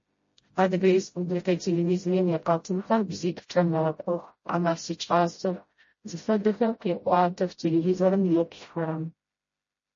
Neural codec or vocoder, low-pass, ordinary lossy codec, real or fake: codec, 16 kHz, 0.5 kbps, FreqCodec, smaller model; 7.2 kHz; MP3, 32 kbps; fake